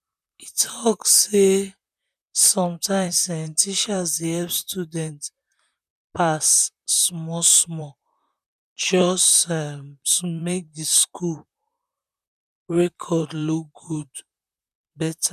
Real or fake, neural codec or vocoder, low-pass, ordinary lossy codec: fake; vocoder, 44.1 kHz, 128 mel bands, Pupu-Vocoder; 14.4 kHz; none